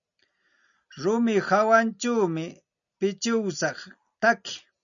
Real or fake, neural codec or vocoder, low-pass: real; none; 7.2 kHz